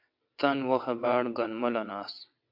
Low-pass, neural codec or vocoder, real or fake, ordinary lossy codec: 5.4 kHz; vocoder, 22.05 kHz, 80 mel bands, WaveNeXt; fake; MP3, 48 kbps